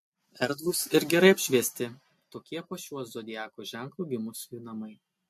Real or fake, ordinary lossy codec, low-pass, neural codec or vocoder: real; MP3, 64 kbps; 14.4 kHz; none